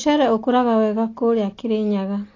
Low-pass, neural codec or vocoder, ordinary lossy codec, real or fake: 7.2 kHz; none; AAC, 32 kbps; real